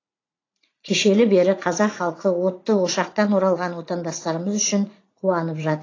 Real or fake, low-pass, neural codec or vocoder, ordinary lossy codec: fake; 7.2 kHz; vocoder, 44.1 kHz, 80 mel bands, Vocos; AAC, 32 kbps